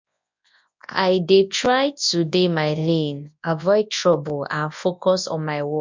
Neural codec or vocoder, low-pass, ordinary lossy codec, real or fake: codec, 24 kHz, 0.9 kbps, WavTokenizer, large speech release; 7.2 kHz; MP3, 64 kbps; fake